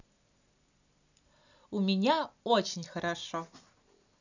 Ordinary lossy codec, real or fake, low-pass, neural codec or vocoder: none; real; 7.2 kHz; none